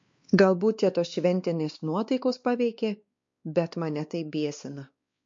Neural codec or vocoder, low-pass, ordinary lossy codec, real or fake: codec, 16 kHz, 2 kbps, X-Codec, WavLM features, trained on Multilingual LibriSpeech; 7.2 kHz; MP3, 48 kbps; fake